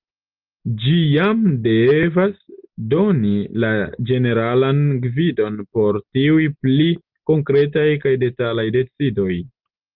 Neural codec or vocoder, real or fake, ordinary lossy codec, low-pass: none; real; Opus, 24 kbps; 5.4 kHz